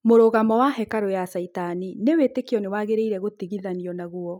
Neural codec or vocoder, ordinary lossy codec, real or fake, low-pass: none; none; real; 19.8 kHz